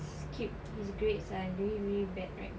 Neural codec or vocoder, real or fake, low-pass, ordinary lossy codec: none; real; none; none